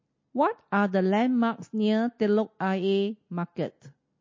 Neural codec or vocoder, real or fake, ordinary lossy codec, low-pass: none; real; MP3, 32 kbps; 7.2 kHz